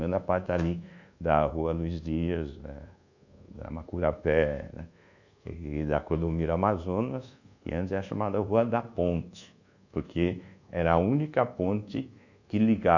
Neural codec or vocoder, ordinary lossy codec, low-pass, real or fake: codec, 24 kHz, 1.2 kbps, DualCodec; none; 7.2 kHz; fake